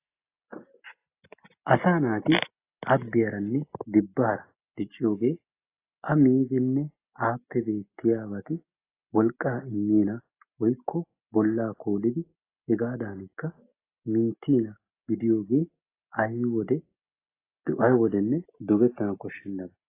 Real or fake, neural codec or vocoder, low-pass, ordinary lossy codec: real; none; 3.6 kHz; AAC, 24 kbps